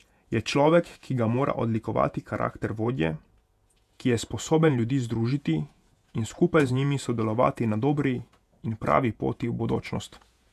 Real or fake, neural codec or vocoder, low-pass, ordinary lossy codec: fake; vocoder, 48 kHz, 128 mel bands, Vocos; 14.4 kHz; none